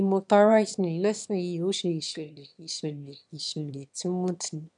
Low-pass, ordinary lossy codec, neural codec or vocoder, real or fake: 9.9 kHz; MP3, 96 kbps; autoencoder, 22.05 kHz, a latent of 192 numbers a frame, VITS, trained on one speaker; fake